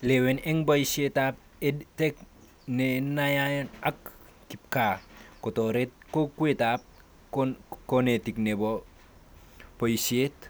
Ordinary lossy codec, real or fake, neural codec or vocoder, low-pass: none; real; none; none